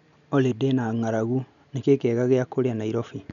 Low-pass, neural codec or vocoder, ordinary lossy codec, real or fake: 7.2 kHz; none; none; real